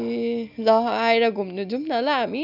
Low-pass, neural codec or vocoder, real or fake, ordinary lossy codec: 5.4 kHz; none; real; none